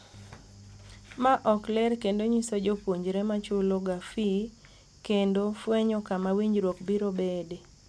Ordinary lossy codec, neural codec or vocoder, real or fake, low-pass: none; none; real; none